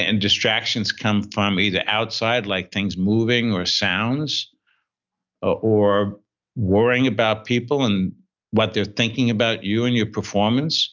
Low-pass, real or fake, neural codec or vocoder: 7.2 kHz; real; none